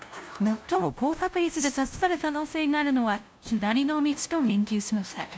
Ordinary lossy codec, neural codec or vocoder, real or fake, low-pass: none; codec, 16 kHz, 0.5 kbps, FunCodec, trained on LibriTTS, 25 frames a second; fake; none